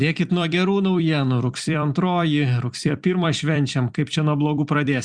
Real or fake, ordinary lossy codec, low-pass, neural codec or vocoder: fake; Opus, 64 kbps; 9.9 kHz; vocoder, 44.1 kHz, 128 mel bands every 256 samples, BigVGAN v2